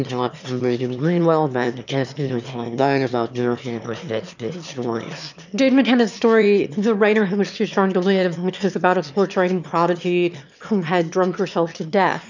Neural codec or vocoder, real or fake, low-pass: autoencoder, 22.05 kHz, a latent of 192 numbers a frame, VITS, trained on one speaker; fake; 7.2 kHz